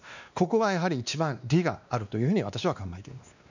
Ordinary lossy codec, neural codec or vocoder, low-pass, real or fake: none; codec, 16 kHz, 2 kbps, X-Codec, WavLM features, trained on Multilingual LibriSpeech; 7.2 kHz; fake